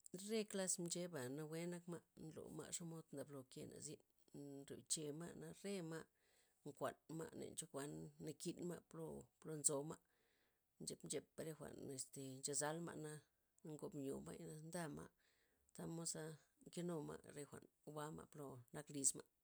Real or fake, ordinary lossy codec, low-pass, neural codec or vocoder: real; none; none; none